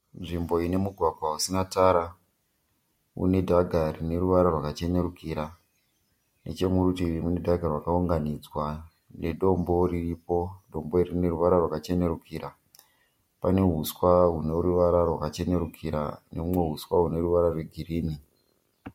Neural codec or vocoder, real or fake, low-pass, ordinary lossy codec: none; real; 19.8 kHz; MP3, 64 kbps